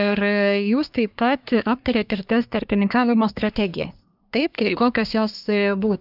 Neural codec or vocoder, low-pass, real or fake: codec, 24 kHz, 1 kbps, SNAC; 5.4 kHz; fake